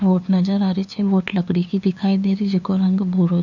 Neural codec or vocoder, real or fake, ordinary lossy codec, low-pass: codec, 16 kHz, 2 kbps, FunCodec, trained on LibriTTS, 25 frames a second; fake; none; 7.2 kHz